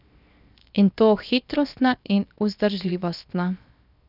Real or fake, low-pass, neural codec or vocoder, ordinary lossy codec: fake; 5.4 kHz; codec, 16 kHz, 0.7 kbps, FocalCodec; none